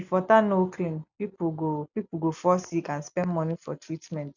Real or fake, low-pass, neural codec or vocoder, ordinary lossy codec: real; 7.2 kHz; none; none